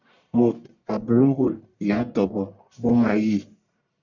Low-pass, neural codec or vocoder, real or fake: 7.2 kHz; codec, 44.1 kHz, 1.7 kbps, Pupu-Codec; fake